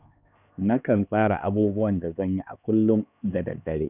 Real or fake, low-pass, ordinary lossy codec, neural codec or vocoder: fake; 3.6 kHz; none; codec, 16 kHz, 2 kbps, X-Codec, HuBERT features, trained on LibriSpeech